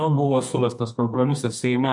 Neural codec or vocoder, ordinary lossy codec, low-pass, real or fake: codec, 24 kHz, 0.9 kbps, WavTokenizer, medium music audio release; MP3, 64 kbps; 10.8 kHz; fake